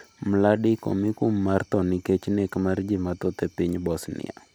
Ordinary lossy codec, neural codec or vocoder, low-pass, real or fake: none; none; none; real